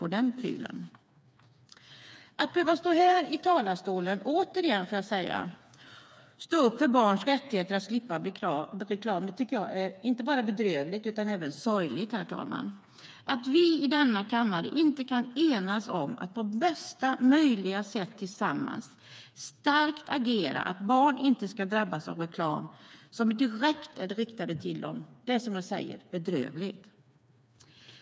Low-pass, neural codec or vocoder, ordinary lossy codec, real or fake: none; codec, 16 kHz, 4 kbps, FreqCodec, smaller model; none; fake